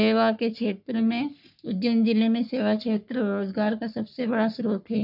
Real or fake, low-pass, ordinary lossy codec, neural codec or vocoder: fake; 5.4 kHz; none; codec, 44.1 kHz, 3.4 kbps, Pupu-Codec